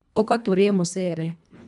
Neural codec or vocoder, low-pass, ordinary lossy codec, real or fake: codec, 24 kHz, 1.5 kbps, HILCodec; 10.8 kHz; none; fake